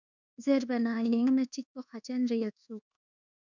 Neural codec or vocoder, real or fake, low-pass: codec, 24 kHz, 1.2 kbps, DualCodec; fake; 7.2 kHz